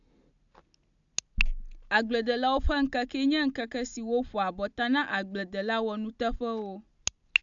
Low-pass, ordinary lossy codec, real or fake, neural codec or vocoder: 7.2 kHz; AAC, 64 kbps; real; none